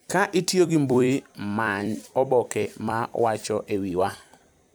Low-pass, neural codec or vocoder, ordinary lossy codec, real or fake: none; vocoder, 44.1 kHz, 128 mel bands every 256 samples, BigVGAN v2; none; fake